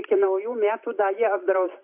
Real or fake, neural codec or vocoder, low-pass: fake; vocoder, 44.1 kHz, 128 mel bands every 512 samples, BigVGAN v2; 3.6 kHz